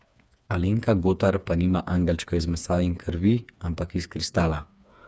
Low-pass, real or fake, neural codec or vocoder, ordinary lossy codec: none; fake; codec, 16 kHz, 4 kbps, FreqCodec, smaller model; none